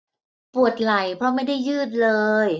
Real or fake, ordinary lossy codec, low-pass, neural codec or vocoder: real; none; none; none